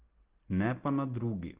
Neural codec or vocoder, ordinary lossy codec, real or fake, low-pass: none; Opus, 16 kbps; real; 3.6 kHz